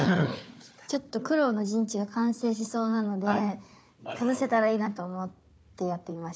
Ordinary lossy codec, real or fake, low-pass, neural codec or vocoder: none; fake; none; codec, 16 kHz, 4 kbps, FunCodec, trained on Chinese and English, 50 frames a second